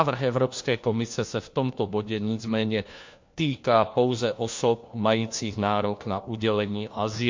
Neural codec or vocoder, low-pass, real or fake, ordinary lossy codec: codec, 16 kHz, 1 kbps, FunCodec, trained on LibriTTS, 50 frames a second; 7.2 kHz; fake; MP3, 48 kbps